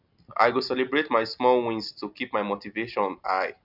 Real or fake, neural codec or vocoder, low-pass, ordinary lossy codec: real; none; 5.4 kHz; none